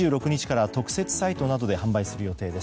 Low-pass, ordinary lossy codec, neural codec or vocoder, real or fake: none; none; none; real